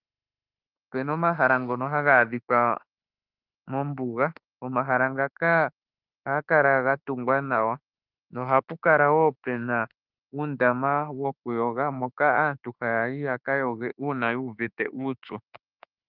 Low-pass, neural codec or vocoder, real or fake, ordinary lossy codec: 5.4 kHz; autoencoder, 48 kHz, 32 numbers a frame, DAC-VAE, trained on Japanese speech; fake; Opus, 24 kbps